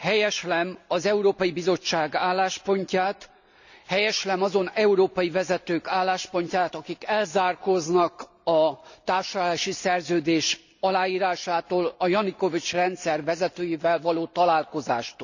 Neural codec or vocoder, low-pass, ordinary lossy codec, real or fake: none; 7.2 kHz; none; real